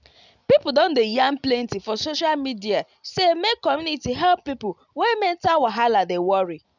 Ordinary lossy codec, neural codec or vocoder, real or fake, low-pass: none; none; real; 7.2 kHz